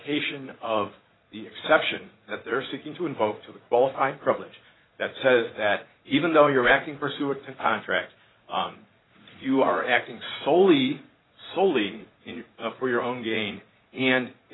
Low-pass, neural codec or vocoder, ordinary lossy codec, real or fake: 7.2 kHz; vocoder, 44.1 kHz, 80 mel bands, Vocos; AAC, 16 kbps; fake